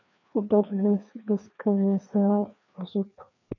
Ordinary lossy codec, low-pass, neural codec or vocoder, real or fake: none; 7.2 kHz; codec, 16 kHz, 2 kbps, FreqCodec, larger model; fake